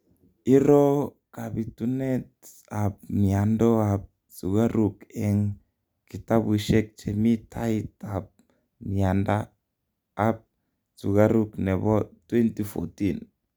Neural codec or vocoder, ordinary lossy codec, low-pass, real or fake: none; none; none; real